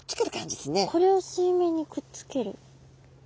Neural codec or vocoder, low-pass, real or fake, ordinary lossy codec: none; none; real; none